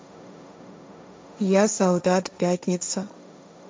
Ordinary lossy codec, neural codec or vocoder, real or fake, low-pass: none; codec, 16 kHz, 1.1 kbps, Voila-Tokenizer; fake; none